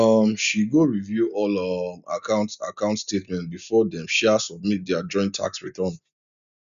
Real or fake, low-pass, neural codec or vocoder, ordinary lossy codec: real; 7.2 kHz; none; none